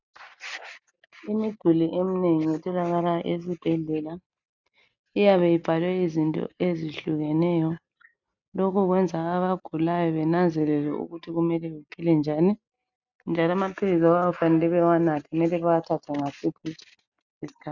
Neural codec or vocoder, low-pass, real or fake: none; 7.2 kHz; real